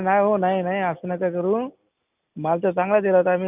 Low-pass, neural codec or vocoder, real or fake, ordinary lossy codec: 3.6 kHz; none; real; none